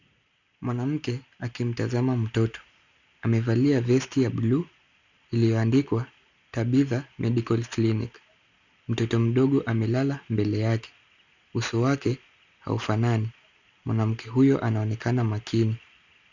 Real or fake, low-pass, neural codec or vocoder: real; 7.2 kHz; none